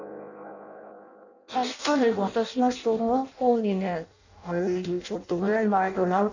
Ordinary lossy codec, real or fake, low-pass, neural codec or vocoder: none; fake; 7.2 kHz; codec, 16 kHz in and 24 kHz out, 0.6 kbps, FireRedTTS-2 codec